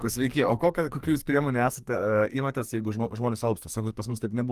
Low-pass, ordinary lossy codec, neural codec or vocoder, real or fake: 14.4 kHz; Opus, 32 kbps; codec, 44.1 kHz, 2.6 kbps, SNAC; fake